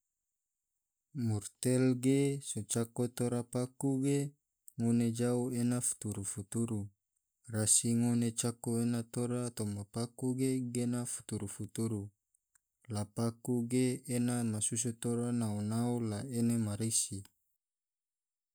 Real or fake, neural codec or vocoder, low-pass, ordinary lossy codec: real; none; none; none